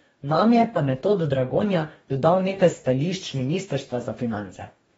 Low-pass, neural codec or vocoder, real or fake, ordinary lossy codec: 19.8 kHz; codec, 44.1 kHz, 2.6 kbps, DAC; fake; AAC, 24 kbps